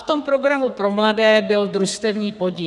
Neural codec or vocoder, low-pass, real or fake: codec, 32 kHz, 1.9 kbps, SNAC; 10.8 kHz; fake